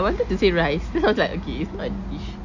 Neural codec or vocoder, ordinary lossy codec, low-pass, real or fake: none; none; 7.2 kHz; real